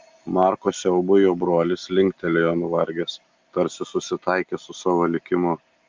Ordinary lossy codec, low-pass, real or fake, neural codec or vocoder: Opus, 32 kbps; 7.2 kHz; real; none